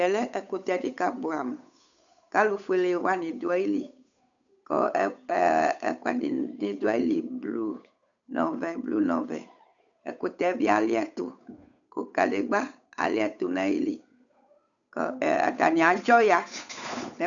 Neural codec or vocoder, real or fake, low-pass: codec, 16 kHz, 8 kbps, FunCodec, trained on Chinese and English, 25 frames a second; fake; 7.2 kHz